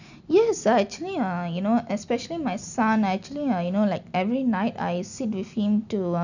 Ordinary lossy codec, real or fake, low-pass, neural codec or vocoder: none; real; 7.2 kHz; none